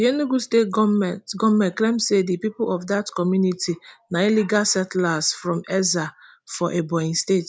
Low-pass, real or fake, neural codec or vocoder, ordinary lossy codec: none; real; none; none